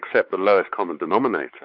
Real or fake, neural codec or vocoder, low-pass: fake; codec, 16 kHz, 4 kbps, X-Codec, WavLM features, trained on Multilingual LibriSpeech; 5.4 kHz